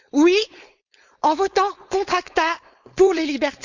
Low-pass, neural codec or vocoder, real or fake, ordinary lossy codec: 7.2 kHz; codec, 16 kHz, 4.8 kbps, FACodec; fake; Opus, 64 kbps